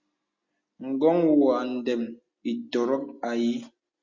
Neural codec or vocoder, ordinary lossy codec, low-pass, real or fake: none; Opus, 64 kbps; 7.2 kHz; real